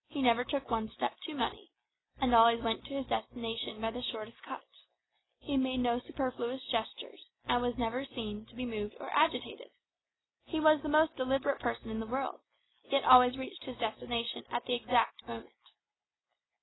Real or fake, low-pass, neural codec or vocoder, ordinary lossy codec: real; 7.2 kHz; none; AAC, 16 kbps